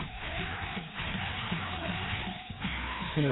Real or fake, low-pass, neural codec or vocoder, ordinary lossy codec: fake; 7.2 kHz; codec, 16 kHz, 2 kbps, FreqCodec, larger model; AAC, 16 kbps